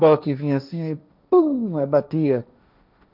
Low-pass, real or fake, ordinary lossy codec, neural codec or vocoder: 5.4 kHz; fake; none; codec, 16 kHz, 1.1 kbps, Voila-Tokenizer